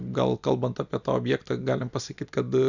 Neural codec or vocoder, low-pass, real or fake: none; 7.2 kHz; real